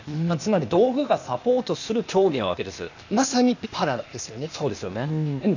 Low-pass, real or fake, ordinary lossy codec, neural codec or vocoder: 7.2 kHz; fake; none; codec, 16 kHz, 0.8 kbps, ZipCodec